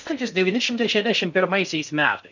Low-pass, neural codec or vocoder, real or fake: 7.2 kHz; codec, 16 kHz in and 24 kHz out, 0.6 kbps, FocalCodec, streaming, 4096 codes; fake